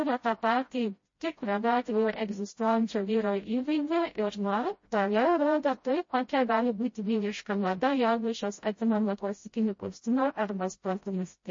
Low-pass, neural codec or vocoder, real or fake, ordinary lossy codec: 7.2 kHz; codec, 16 kHz, 0.5 kbps, FreqCodec, smaller model; fake; MP3, 32 kbps